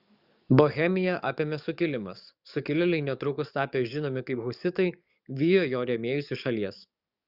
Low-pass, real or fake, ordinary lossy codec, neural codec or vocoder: 5.4 kHz; fake; Opus, 64 kbps; codec, 44.1 kHz, 7.8 kbps, DAC